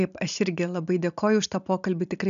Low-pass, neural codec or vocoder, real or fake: 7.2 kHz; none; real